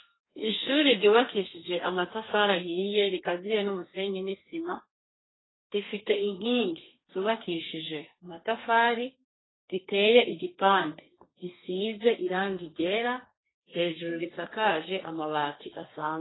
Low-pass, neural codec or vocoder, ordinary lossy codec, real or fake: 7.2 kHz; codec, 32 kHz, 1.9 kbps, SNAC; AAC, 16 kbps; fake